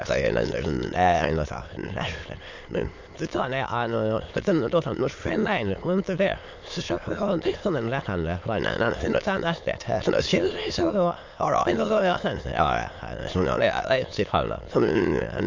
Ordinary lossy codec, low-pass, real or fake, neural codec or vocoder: MP3, 48 kbps; 7.2 kHz; fake; autoencoder, 22.05 kHz, a latent of 192 numbers a frame, VITS, trained on many speakers